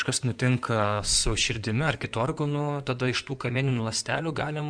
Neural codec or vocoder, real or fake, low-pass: codec, 16 kHz in and 24 kHz out, 2.2 kbps, FireRedTTS-2 codec; fake; 9.9 kHz